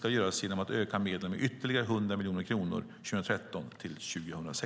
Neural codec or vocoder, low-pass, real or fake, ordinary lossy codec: none; none; real; none